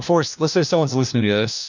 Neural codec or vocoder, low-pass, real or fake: codec, 16 kHz, 1 kbps, FunCodec, trained on LibriTTS, 50 frames a second; 7.2 kHz; fake